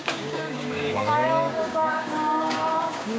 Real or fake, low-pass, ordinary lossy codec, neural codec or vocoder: fake; none; none; codec, 16 kHz, 6 kbps, DAC